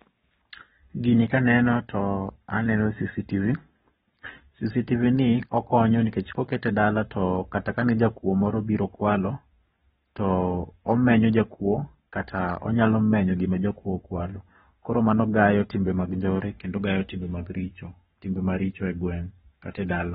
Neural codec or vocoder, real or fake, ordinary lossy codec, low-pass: codec, 44.1 kHz, 7.8 kbps, Pupu-Codec; fake; AAC, 16 kbps; 19.8 kHz